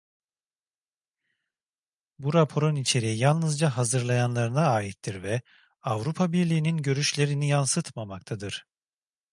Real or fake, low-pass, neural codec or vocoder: real; 10.8 kHz; none